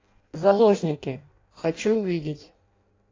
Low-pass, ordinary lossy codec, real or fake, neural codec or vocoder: 7.2 kHz; AAC, 32 kbps; fake; codec, 16 kHz in and 24 kHz out, 0.6 kbps, FireRedTTS-2 codec